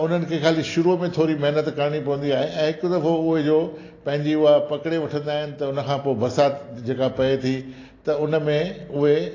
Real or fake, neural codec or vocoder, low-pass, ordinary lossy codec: real; none; 7.2 kHz; AAC, 32 kbps